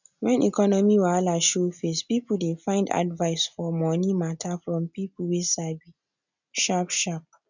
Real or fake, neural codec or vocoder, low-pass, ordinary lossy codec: real; none; 7.2 kHz; none